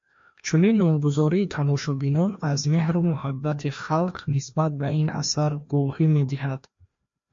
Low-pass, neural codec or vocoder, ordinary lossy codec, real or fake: 7.2 kHz; codec, 16 kHz, 1 kbps, FreqCodec, larger model; MP3, 48 kbps; fake